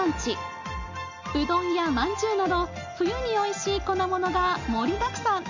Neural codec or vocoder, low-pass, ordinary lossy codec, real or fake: none; 7.2 kHz; none; real